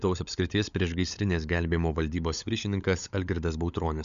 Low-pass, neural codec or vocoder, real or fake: 7.2 kHz; codec, 16 kHz, 16 kbps, FunCodec, trained on LibriTTS, 50 frames a second; fake